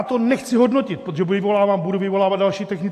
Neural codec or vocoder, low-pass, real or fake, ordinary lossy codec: none; 14.4 kHz; real; AAC, 64 kbps